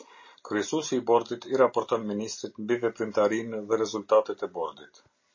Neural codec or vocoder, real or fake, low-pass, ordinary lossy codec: none; real; 7.2 kHz; MP3, 32 kbps